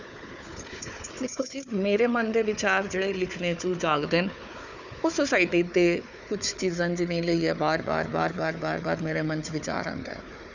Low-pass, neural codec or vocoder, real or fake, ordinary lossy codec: 7.2 kHz; codec, 16 kHz, 4 kbps, FunCodec, trained on Chinese and English, 50 frames a second; fake; none